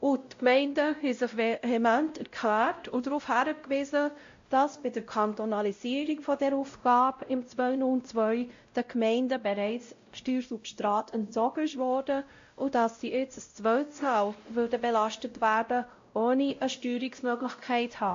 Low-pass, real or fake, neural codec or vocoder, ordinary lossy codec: 7.2 kHz; fake; codec, 16 kHz, 0.5 kbps, X-Codec, WavLM features, trained on Multilingual LibriSpeech; AAC, 48 kbps